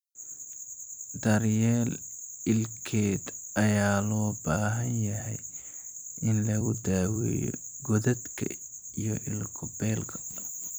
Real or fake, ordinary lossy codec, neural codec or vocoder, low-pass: fake; none; vocoder, 44.1 kHz, 128 mel bands every 256 samples, BigVGAN v2; none